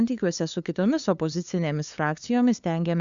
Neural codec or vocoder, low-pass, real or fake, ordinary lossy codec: codec, 16 kHz, 2 kbps, FunCodec, trained on LibriTTS, 25 frames a second; 7.2 kHz; fake; Opus, 64 kbps